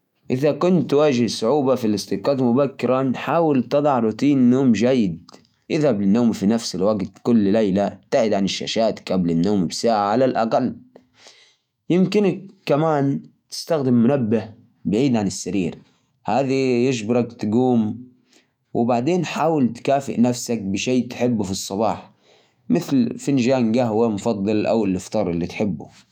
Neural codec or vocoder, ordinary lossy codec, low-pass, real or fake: autoencoder, 48 kHz, 128 numbers a frame, DAC-VAE, trained on Japanese speech; none; 19.8 kHz; fake